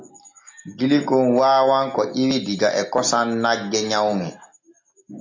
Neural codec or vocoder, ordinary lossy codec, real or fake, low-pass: none; MP3, 48 kbps; real; 7.2 kHz